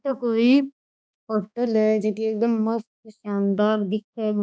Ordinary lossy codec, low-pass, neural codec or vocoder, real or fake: none; none; codec, 16 kHz, 2 kbps, X-Codec, HuBERT features, trained on balanced general audio; fake